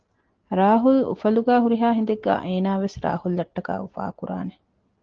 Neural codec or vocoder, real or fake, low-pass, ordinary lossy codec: none; real; 7.2 kHz; Opus, 16 kbps